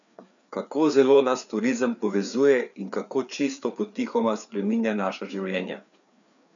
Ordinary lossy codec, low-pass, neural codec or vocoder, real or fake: MP3, 96 kbps; 7.2 kHz; codec, 16 kHz, 4 kbps, FreqCodec, larger model; fake